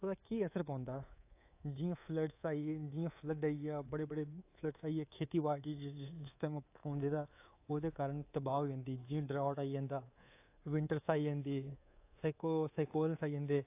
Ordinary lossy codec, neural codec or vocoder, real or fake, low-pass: AAC, 24 kbps; codec, 24 kHz, 3.1 kbps, DualCodec; fake; 3.6 kHz